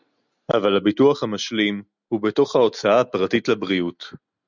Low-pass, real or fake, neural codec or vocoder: 7.2 kHz; real; none